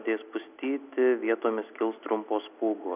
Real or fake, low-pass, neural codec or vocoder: real; 3.6 kHz; none